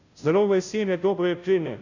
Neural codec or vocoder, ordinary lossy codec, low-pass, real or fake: codec, 16 kHz, 0.5 kbps, FunCodec, trained on Chinese and English, 25 frames a second; none; 7.2 kHz; fake